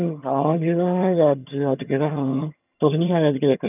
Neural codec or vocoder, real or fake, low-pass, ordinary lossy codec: vocoder, 22.05 kHz, 80 mel bands, HiFi-GAN; fake; 3.6 kHz; none